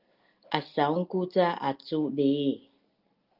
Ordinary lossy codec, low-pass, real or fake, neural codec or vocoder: Opus, 32 kbps; 5.4 kHz; real; none